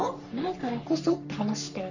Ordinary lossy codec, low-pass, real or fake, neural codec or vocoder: none; 7.2 kHz; fake; codec, 44.1 kHz, 3.4 kbps, Pupu-Codec